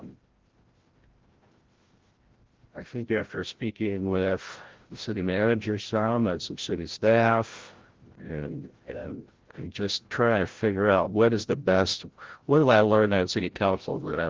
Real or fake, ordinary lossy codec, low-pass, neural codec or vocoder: fake; Opus, 16 kbps; 7.2 kHz; codec, 16 kHz, 0.5 kbps, FreqCodec, larger model